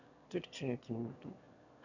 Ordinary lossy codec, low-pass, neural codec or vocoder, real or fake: none; 7.2 kHz; autoencoder, 22.05 kHz, a latent of 192 numbers a frame, VITS, trained on one speaker; fake